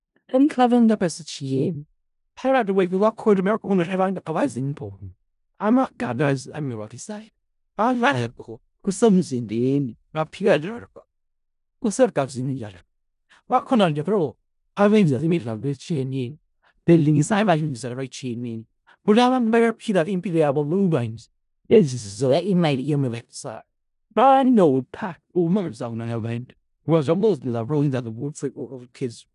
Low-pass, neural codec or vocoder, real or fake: 10.8 kHz; codec, 16 kHz in and 24 kHz out, 0.4 kbps, LongCat-Audio-Codec, four codebook decoder; fake